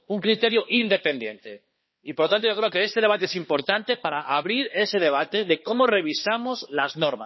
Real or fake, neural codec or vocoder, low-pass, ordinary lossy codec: fake; codec, 16 kHz, 2 kbps, X-Codec, HuBERT features, trained on balanced general audio; 7.2 kHz; MP3, 24 kbps